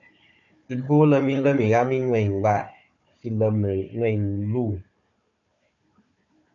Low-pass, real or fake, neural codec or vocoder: 7.2 kHz; fake; codec, 16 kHz, 4 kbps, FunCodec, trained on Chinese and English, 50 frames a second